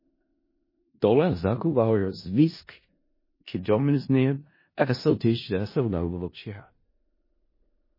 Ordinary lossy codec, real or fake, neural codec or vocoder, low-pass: MP3, 24 kbps; fake; codec, 16 kHz in and 24 kHz out, 0.4 kbps, LongCat-Audio-Codec, four codebook decoder; 5.4 kHz